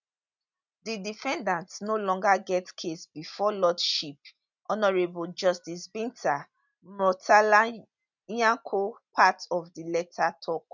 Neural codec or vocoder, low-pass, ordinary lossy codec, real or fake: none; 7.2 kHz; none; real